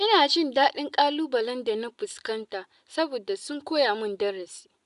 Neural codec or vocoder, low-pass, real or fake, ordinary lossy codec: none; 10.8 kHz; real; none